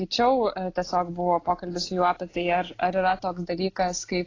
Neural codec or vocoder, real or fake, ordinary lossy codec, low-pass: none; real; AAC, 32 kbps; 7.2 kHz